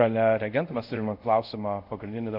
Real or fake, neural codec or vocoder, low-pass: fake; codec, 24 kHz, 0.5 kbps, DualCodec; 5.4 kHz